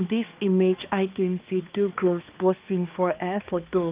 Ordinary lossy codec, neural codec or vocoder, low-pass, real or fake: Opus, 24 kbps; codec, 16 kHz, 2 kbps, X-Codec, HuBERT features, trained on balanced general audio; 3.6 kHz; fake